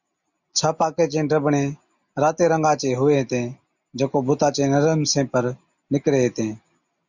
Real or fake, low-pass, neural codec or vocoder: real; 7.2 kHz; none